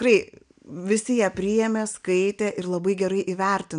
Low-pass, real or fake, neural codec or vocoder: 9.9 kHz; real; none